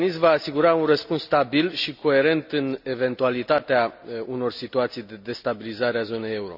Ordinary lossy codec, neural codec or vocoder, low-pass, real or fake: none; none; 5.4 kHz; real